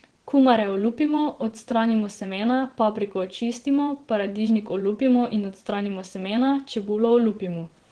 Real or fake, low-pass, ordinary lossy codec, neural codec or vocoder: fake; 9.9 kHz; Opus, 16 kbps; vocoder, 22.05 kHz, 80 mel bands, WaveNeXt